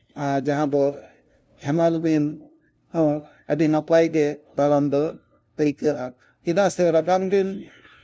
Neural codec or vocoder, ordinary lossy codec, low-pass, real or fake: codec, 16 kHz, 0.5 kbps, FunCodec, trained on LibriTTS, 25 frames a second; none; none; fake